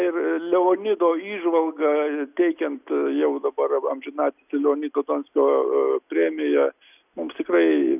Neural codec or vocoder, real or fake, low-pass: none; real; 3.6 kHz